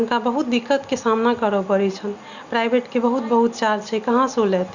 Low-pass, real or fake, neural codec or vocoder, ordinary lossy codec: 7.2 kHz; real; none; Opus, 64 kbps